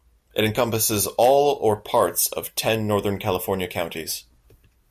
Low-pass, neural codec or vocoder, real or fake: 14.4 kHz; none; real